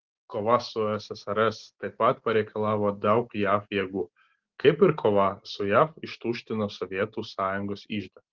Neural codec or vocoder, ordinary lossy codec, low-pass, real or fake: none; Opus, 16 kbps; 7.2 kHz; real